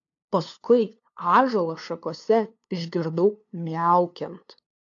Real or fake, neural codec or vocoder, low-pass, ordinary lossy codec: fake; codec, 16 kHz, 2 kbps, FunCodec, trained on LibriTTS, 25 frames a second; 7.2 kHz; AAC, 48 kbps